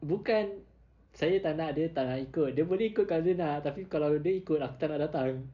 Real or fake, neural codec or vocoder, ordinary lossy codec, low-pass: real; none; none; 7.2 kHz